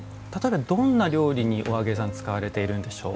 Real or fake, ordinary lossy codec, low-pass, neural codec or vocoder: real; none; none; none